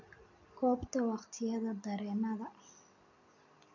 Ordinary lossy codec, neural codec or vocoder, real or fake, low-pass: none; none; real; 7.2 kHz